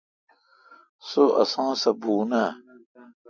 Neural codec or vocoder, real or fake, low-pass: none; real; 7.2 kHz